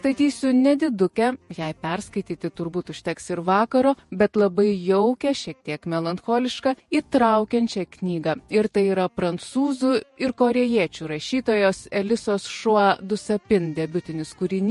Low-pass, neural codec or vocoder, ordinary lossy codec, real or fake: 14.4 kHz; vocoder, 48 kHz, 128 mel bands, Vocos; MP3, 48 kbps; fake